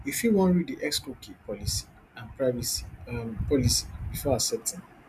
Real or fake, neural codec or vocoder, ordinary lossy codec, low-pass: real; none; none; 14.4 kHz